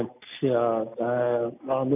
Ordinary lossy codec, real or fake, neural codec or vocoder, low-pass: none; real; none; 3.6 kHz